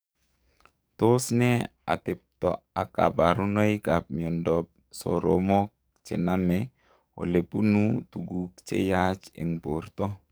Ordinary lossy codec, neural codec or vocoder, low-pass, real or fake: none; codec, 44.1 kHz, 7.8 kbps, DAC; none; fake